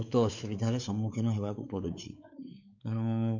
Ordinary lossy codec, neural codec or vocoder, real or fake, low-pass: none; codec, 16 kHz, 4 kbps, X-Codec, WavLM features, trained on Multilingual LibriSpeech; fake; 7.2 kHz